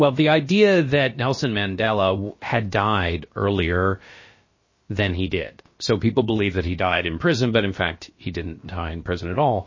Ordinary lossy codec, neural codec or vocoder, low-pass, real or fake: MP3, 32 kbps; codec, 16 kHz, about 1 kbps, DyCAST, with the encoder's durations; 7.2 kHz; fake